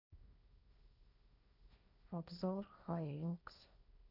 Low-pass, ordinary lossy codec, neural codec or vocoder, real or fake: 5.4 kHz; none; codec, 16 kHz, 1.1 kbps, Voila-Tokenizer; fake